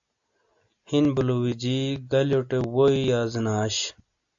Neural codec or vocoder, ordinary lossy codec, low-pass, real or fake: none; AAC, 64 kbps; 7.2 kHz; real